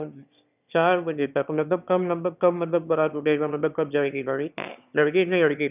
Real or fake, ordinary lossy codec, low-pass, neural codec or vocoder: fake; none; 3.6 kHz; autoencoder, 22.05 kHz, a latent of 192 numbers a frame, VITS, trained on one speaker